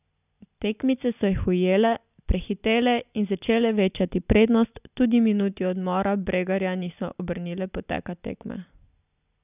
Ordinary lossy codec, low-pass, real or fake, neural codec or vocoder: none; 3.6 kHz; real; none